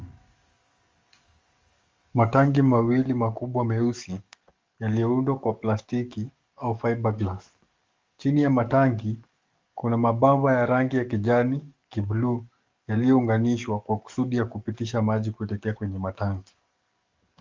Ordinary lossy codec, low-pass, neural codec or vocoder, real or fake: Opus, 32 kbps; 7.2 kHz; codec, 44.1 kHz, 7.8 kbps, DAC; fake